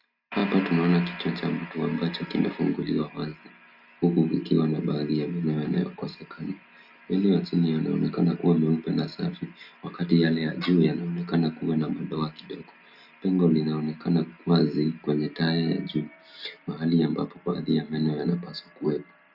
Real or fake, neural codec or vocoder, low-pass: real; none; 5.4 kHz